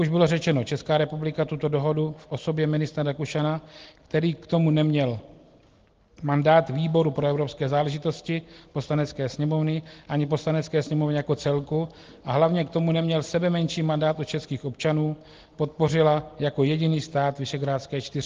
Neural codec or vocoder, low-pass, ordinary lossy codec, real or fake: none; 7.2 kHz; Opus, 16 kbps; real